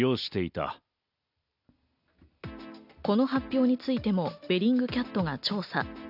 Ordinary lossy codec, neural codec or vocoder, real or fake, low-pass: none; none; real; 5.4 kHz